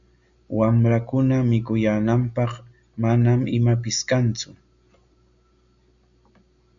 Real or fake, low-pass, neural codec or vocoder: real; 7.2 kHz; none